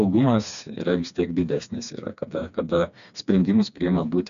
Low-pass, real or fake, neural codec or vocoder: 7.2 kHz; fake; codec, 16 kHz, 2 kbps, FreqCodec, smaller model